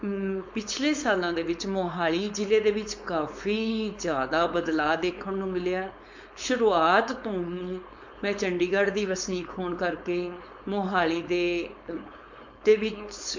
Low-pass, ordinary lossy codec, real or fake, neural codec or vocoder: 7.2 kHz; MP3, 48 kbps; fake; codec, 16 kHz, 4.8 kbps, FACodec